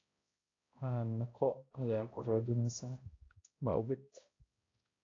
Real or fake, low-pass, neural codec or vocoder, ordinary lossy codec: fake; 7.2 kHz; codec, 16 kHz, 0.5 kbps, X-Codec, HuBERT features, trained on balanced general audio; AAC, 32 kbps